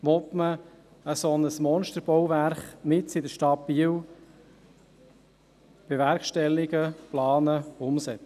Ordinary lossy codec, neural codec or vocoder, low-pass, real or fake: none; none; 14.4 kHz; real